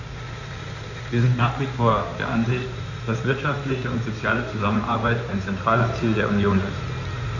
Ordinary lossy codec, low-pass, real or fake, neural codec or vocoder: none; 7.2 kHz; fake; codec, 16 kHz in and 24 kHz out, 2.2 kbps, FireRedTTS-2 codec